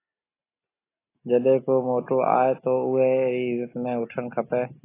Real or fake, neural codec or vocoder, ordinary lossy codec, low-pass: real; none; AAC, 16 kbps; 3.6 kHz